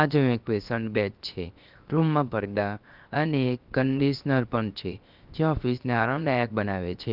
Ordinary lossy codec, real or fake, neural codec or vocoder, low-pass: Opus, 24 kbps; fake; codec, 16 kHz, 0.7 kbps, FocalCodec; 5.4 kHz